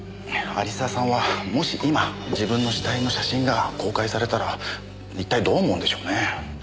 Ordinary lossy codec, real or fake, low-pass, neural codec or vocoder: none; real; none; none